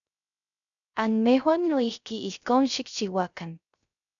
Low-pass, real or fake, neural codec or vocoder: 7.2 kHz; fake; codec, 16 kHz, 0.3 kbps, FocalCodec